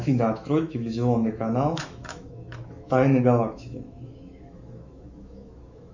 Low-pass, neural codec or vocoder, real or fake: 7.2 kHz; autoencoder, 48 kHz, 128 numbers a frame, DAC-VAE, trained on Japanese speech; fake